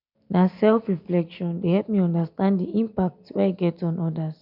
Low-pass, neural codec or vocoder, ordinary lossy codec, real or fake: 5.4 kHz; none; none; real